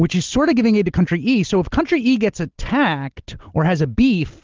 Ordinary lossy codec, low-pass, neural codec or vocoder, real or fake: Opus, 16 kbps; 7.2 kHz; none; real